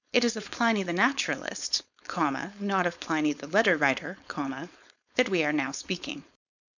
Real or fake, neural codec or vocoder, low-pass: fake; codec, 16 kHz, 4.8 kbps, FACodec; 7.2 kHz